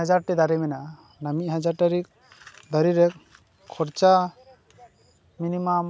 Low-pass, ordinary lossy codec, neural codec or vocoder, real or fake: none; none; none; real